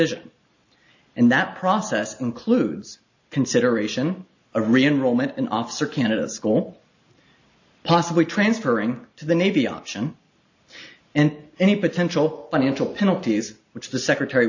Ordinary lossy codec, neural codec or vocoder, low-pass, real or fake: AAC, 48 kbps; none; 7.2 kHz; real